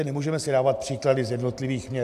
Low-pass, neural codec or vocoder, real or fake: 14.4 kHz; none; real